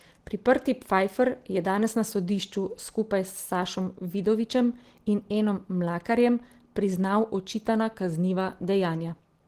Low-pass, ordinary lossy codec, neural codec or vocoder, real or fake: 14.4 kHz; Opus, 16 kbps; none; real